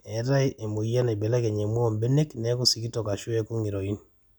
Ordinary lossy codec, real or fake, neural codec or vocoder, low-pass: none; real; none; none